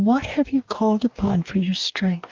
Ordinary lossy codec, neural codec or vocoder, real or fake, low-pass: Opus, 24 kbps; codec, 44.1 kHz, 2.6 kbps, DAC; fake; 7.2 kHz